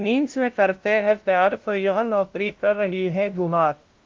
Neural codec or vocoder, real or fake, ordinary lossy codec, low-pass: codec, 16 kHz, 0.5 kbps, FunCodec, trained on LibriTTS, 25 frames a second; fake; Opus, 32 kbps; 7.2 kHz